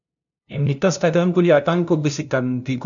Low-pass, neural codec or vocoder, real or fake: 7.2 kHz; codec, 16 kHz, 0.5 kbps, FunCodec, trained on LibriTTS, 25 frames a second; fake